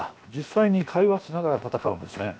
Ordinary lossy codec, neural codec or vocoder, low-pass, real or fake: none; codec, 16 kHz, 0.7 kbps, FocalCodec; none; fake